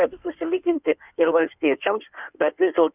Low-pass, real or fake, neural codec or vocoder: 3.6 kHz; fake; codec, 16 kHz in and 24 kHz out, 1.1 kbps, FireRedTTS-2 codec